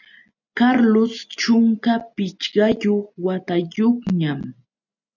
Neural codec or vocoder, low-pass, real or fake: none; 7.2 kHz; real